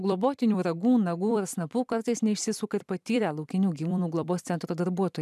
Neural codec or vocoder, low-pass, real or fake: vocoder, 44.1 kHz, 128 mel bands every 256 samples, BigVGAN v2; 14.4 kHz; fake